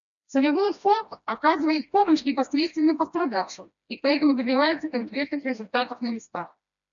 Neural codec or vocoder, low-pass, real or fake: codec, 16 kHz, 1 kbps, FreqCodec, smaller model; 7.2 kHz; fake